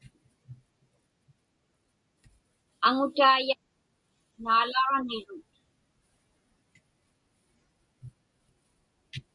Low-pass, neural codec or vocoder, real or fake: 10.8 kHz; none; real